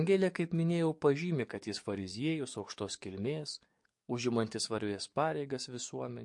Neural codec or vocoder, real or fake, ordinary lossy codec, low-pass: codec, 44.1 kHz, 7.8 kbps, DAC; fake; MP3, 48 kbps; 10.8 kHz